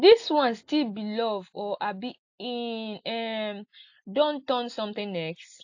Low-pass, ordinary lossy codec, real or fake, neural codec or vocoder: 7.2 kHz; AAC, 48 kbps; real; none